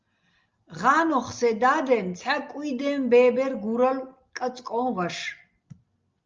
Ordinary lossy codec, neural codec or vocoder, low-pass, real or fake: Opus, 24 kbps; none; 7.2 kHz; real